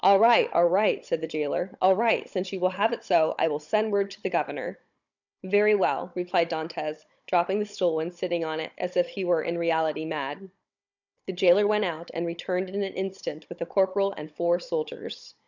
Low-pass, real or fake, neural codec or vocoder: 7.2 kHz; fake; codec, 16 kHz, 16 kbps, FunCodec, trained on Chinese and English, 50 frames a second